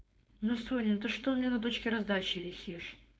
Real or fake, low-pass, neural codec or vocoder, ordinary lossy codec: fake; none; codec, 16 kHz, 4.8 kbps, FACodec; none